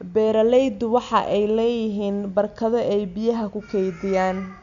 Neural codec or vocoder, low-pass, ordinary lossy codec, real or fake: none; 7.2 kHz; none; real